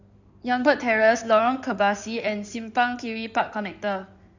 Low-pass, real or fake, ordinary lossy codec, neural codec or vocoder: 7.2 kHz; fake; none; codec, 16 kHz in and 24 kHz out, 2.2 kbps, FireRedTTS-2 codec